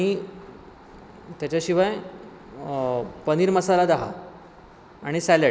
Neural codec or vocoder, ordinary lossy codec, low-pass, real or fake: none; none; none; real